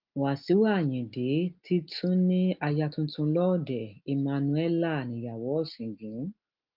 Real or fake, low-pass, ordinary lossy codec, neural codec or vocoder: real; 5.4 kHz; Opus, 32 kbps; none